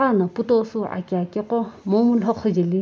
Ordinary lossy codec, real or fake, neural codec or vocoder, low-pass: none; real; none; none